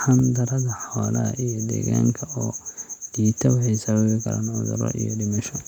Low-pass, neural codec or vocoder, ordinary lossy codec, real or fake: 19.8 kHz; none; none; real